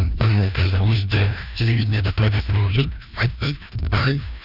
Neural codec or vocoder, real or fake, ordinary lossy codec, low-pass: codec, 16 kHz, 1 kbps, FreqCodec, larger model; fake; none; 5.4 kHz